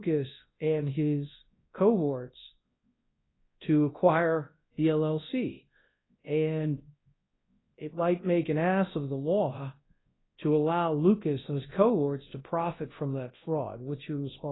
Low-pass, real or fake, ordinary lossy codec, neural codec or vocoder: 7.2 kHz; fake; AAC, 16 kbps; codec, 24 kHz, 0.9 kbps, WavTokenizer, large speech release